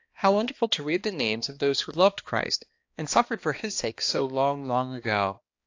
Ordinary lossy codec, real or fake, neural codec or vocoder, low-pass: AAC, 48 kbps; fake; codec, 16 kHz, 2 kbps, X-Codec, HuBERT features, trained on balanced general audio; 7.2 kHz